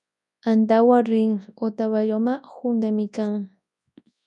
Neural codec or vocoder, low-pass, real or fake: codec, 24 kHz, 0.9 kbps, WavTokenizer, large speech release; 10.8 kHz; fake